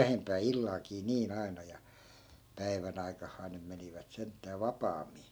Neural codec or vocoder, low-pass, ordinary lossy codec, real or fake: none; none; none; real